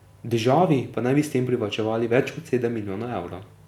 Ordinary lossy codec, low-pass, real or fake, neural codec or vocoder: none; 19.8 kHz; real; none